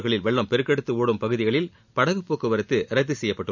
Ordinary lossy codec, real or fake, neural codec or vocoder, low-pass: none; real; none; 7.2 kHz